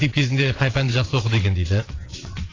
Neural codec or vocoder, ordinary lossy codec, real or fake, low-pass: vocoder, 22.05 kHz, 80 mel bands, WaveNeXt; AAC, 32 kbps; fake; 7.2 kHz